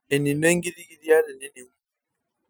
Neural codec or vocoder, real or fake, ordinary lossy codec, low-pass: none; real; none; none